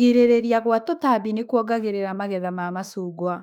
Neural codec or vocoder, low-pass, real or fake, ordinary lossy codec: autoencoder, 48 kHz, 32 numbers a frame, DAC-VAE, trained on Japanese speech; 19.8 kHz; fake; none